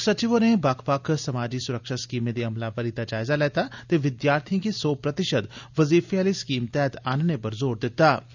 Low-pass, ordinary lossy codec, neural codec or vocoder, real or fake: 7.2 kHz; none; none; real